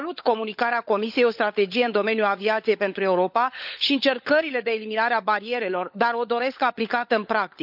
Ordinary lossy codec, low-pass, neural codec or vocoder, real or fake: none; 5.4 kHz; codec, 16 kHz, 16 kbps, FunCodec, trained on LibriTTS, 50 frames a second; fake